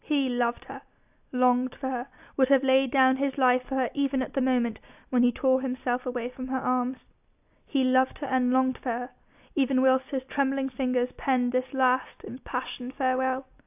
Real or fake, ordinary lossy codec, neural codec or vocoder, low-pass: real; AAC, 32 kbps; none; 3.6 kHz